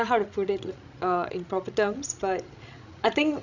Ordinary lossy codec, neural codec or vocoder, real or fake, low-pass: none; codec, 16 kHz, 16 kbps, FreqCodec, larger model; fake; 7.2 kHz